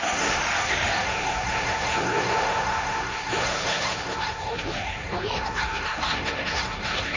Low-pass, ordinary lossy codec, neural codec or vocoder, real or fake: 7.2 kHz; MP3, 48 kbps; codec, 16 kHz, 1.1 kbps, Voila-Tokenizer; fake